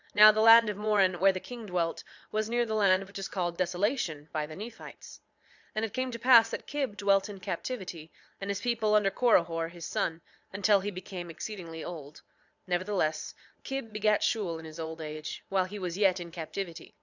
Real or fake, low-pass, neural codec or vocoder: fake; 7.2 kHz; vocoder, 22.05 kHz, 80 mel bands, Vocos